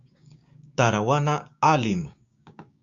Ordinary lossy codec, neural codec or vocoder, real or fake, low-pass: Opus, 64 kbps; codec, 16 kHz, 6 kbps, DAC; fake; 7.2 kHz